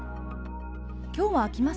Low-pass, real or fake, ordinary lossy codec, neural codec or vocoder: none; real; none; none